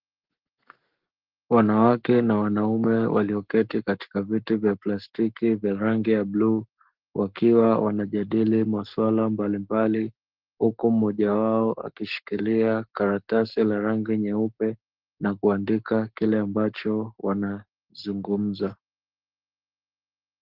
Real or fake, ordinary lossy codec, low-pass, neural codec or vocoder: fake; Opus, 16 kbps; 5.4 kHz; codec, 44.1 kHz, 7.8 kbps, Pupu-Codec